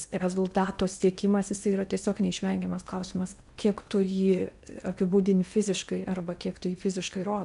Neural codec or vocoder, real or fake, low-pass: codec, 16 kHz in and 24 kHz out, 0.8 kbps, FocalCodec, streaming, 65536 codes; fake; 10.8 kHz